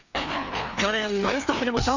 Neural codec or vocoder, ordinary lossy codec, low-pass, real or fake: codec, 16 kHz, 2 kbps, FreqCodec, larger model; none; 7.2 kHz; fake